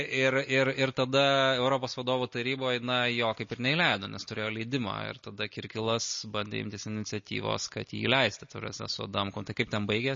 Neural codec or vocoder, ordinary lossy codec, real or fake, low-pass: none; MP3, 32 kbps; real; 7.2 kHz